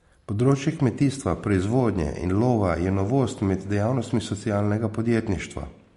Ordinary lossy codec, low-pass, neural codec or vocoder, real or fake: MP3, 48 kbps; 14.4 kHz; none; real